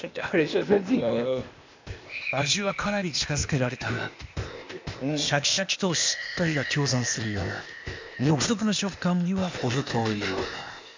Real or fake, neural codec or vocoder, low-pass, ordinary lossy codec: fake; codec, 16 kHz, 0.8 kbps, ZipCodec; 7.2 kHz; MP3, 64 kbps